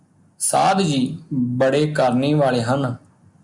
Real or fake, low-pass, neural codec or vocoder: real; 10.8 kHz; none